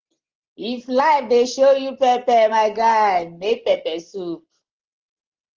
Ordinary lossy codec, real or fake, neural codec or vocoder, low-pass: Opus, 16 kbps; real; none; 7.2 kHz